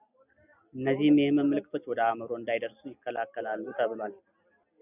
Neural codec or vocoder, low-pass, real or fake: none; 3.6 kHz; real